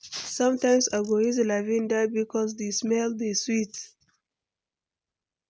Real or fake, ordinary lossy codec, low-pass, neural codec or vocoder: real; none; none; none